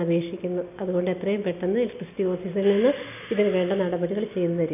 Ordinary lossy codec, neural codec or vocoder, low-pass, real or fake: none; none; 3.6 kHz; real